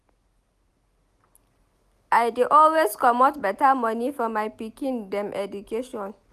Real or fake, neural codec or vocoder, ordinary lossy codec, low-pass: real; none; none; 14.4 kHz